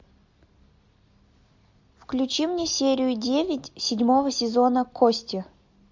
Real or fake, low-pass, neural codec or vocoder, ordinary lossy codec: real; 7.2 kHz; none; MP3, 64 kbps